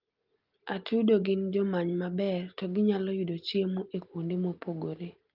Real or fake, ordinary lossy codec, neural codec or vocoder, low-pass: real; Opus, 32 kbps; none; 5.4 kHz